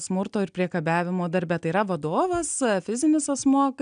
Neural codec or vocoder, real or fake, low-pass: none; real; 9.9 kHz